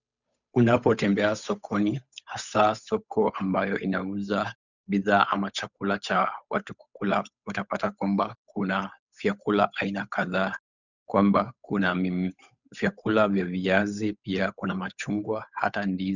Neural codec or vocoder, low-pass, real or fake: codec, 16 kHz, 8 kbps, FunCodec, trained on Chinese and English, 25 frames a second; 7.2 kHz; fake